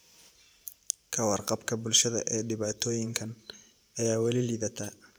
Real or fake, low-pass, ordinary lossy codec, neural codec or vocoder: fake; none; none; vocoder, 44.1 kHz, 128 mel bands every 512 samples, BigVGAN v2